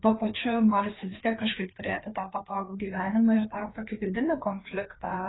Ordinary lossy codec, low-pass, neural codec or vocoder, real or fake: AAC, 16 kbps; 7.2 kHz; codec, 16 kHz, 2 kbps, FreqCodec, larger model; fake